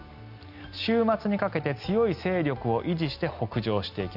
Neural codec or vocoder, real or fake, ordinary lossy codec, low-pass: none; real; none; 5.4 kHz